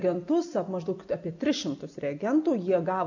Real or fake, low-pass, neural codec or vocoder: real; 7.2 kHz; none